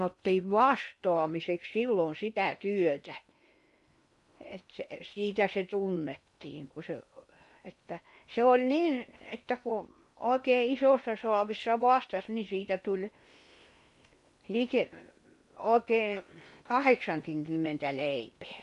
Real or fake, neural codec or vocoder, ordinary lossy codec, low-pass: fake; codec, 16 kHz in and 24 kHz out, 0.8 kbps, FocalCodec, streaming, 65536 codes; MP3, 64 kbps; 10.8 kHz